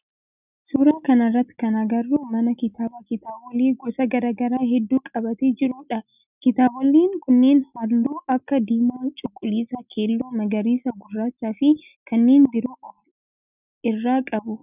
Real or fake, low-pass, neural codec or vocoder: real; 3.6 kHz; none